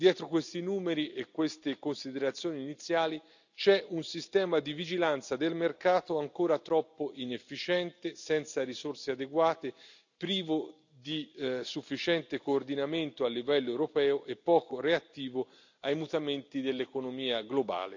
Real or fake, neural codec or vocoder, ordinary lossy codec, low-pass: real; none; none; 7.2 kHz